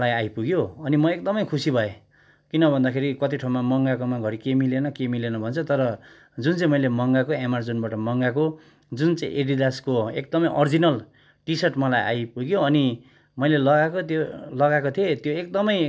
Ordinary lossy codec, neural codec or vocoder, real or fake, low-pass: none; none; real; none